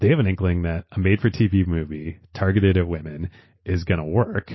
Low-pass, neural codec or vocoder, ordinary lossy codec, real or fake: 7.2 kHz; none; MP3, 24 kbps; real